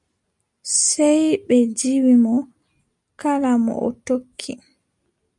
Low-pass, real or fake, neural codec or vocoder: 10.8 kHz; real; none